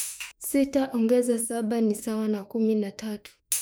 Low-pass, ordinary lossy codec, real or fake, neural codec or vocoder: none; none; fake; autoencoder, 48 kHz, 32 numbers a frame, DAC-VAE, trained on Japanese speech